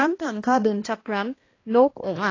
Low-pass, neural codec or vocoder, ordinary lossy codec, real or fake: 7.2 kHz; codec, 16 kHz, 1 kbps, X-Codec, HuBERT features, trained on balanced general audio; AAC, 32 kbps; fake